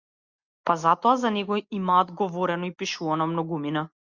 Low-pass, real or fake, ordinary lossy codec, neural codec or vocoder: 7.2 kHz; real; Opus, 64 kbps; none